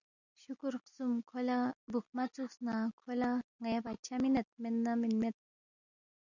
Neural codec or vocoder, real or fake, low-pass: none; real; 7.2 kHz